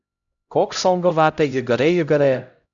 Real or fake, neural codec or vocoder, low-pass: fake; codec, 16 kHz, 0.5 kbps, X-Codec, HuBERT features, trained on LibriSpeech; 7.2 kHz